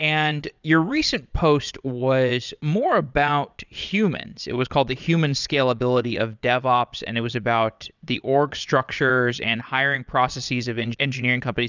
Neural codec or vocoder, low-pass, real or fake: vocoder, 22.05 kHz, 80 mel bands, Vocos; 7.2 kHz; fake